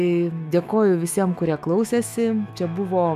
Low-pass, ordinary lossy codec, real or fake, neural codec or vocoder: 14.4 kHz; MP3, 96 kbps; fake; autoencoder, 48 kHz, 128 numbers a frame, DAC-VAE, trained on Japanese speech